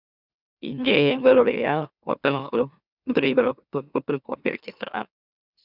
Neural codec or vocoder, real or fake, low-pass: autoencoder, 44.1 kHz, a latent of 192 numbers a frame, MeloTTS; fake; 5.4 kHz